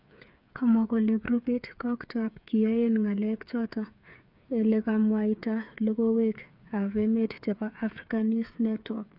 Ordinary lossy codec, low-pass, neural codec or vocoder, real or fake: none; 5.4 kHz; codec, 16 kHz, 8 kbps, FreqCodec, smaller model; fake